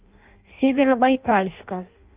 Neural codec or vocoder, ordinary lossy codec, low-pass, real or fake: codec, 16 kHz in and 24 kHz out, 0.6 kbps, FireRedTTS-2 codec; Opus, 24 kbps; 3.6 kHz; fake